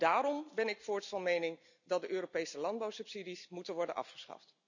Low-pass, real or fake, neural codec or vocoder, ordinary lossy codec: 7.2 kHz; real; none; none